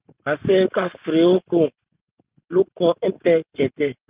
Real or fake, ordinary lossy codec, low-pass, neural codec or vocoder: real; Opus, 32 kbps; 3.6 kHz; none